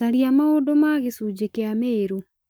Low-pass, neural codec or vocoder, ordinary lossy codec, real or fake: none; codec, 44.1 kHz, 7.8 kbps, Pupu-Codec; none; fake